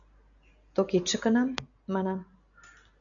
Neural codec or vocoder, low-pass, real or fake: none; 7.2 kHz; real